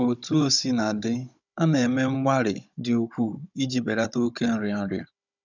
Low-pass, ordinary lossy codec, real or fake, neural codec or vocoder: 7.2 kHz; none; fake; codec, 16 kHz, 16 kbps, FunCodec, trained on Chinese and English, 50 frames a second